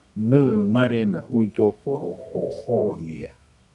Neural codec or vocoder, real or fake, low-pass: codec, 24 kHz, 0.9 kbps, WavTokenizer, medium music audio release; fake; 10.8 kHz